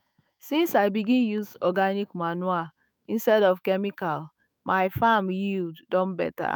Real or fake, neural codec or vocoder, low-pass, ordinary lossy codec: fake; autoencoder, 48 kHz, 128 numbers a frame, DAC-VAE, trained on Japanese speech; none; none